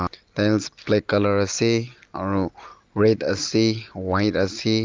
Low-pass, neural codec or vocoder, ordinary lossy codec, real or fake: 7.2 kHz; none; Opus, 32 kbps; real